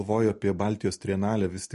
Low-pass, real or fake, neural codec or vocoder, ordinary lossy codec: 14.4 kHz; real; none; MP3, 48 kbps